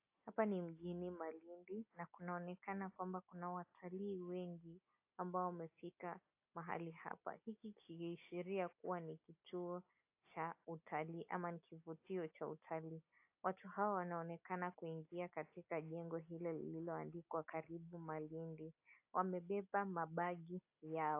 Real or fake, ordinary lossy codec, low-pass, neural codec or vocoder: real; AAC, 32 kbps; 3.6 kHz; none